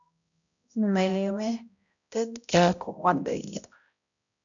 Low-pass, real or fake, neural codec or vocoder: 7.2 kHz; fake; codec, 16 kHz, 0.5 kbps, X-Codec, HuBERT features, trained on balanced general audio